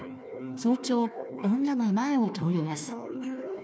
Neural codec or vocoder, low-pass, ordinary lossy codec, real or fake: codec, 16 kHz, 1 kbps, FunCodec, trained on Chinese and English, 50 frames a second; none; none; fake